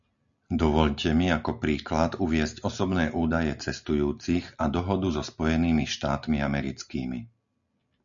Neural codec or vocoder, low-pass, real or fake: none; 7.2 kHz; real